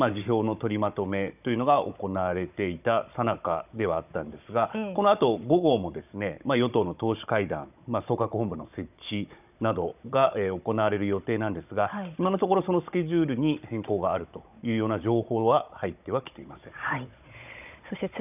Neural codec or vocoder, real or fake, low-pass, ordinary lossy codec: codec, 16 kHz, 16 kbps, FunCodec, trained on Chinese and English, 50 frames a second; fake; 3.6 kHz; none